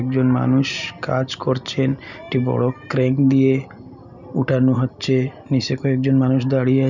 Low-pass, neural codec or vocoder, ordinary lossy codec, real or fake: 7.2 kHz; none; none; real